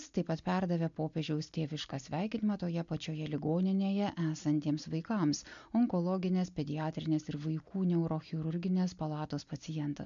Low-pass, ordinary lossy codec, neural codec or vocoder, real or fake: 7.2 kHz; MP3, 64 kbps; none; real